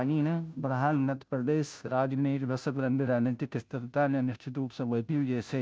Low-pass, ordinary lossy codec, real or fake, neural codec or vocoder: none; none; fake; codec, 16 kHz, 0.5 kbps, FunCodec, trained on Chinese and English, 25 frames a second